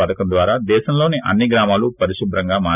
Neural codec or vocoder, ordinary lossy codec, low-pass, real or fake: none; none; 3.6 kHz; real